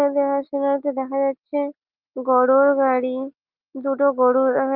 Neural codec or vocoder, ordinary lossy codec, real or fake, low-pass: none; Opus, 24 kbps; real; 5.4 kHz